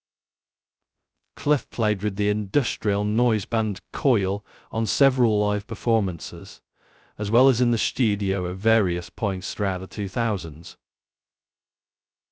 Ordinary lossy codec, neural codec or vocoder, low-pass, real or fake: none; codec, 16 kHz, 0.2 kbps, FocalCodec; none; fake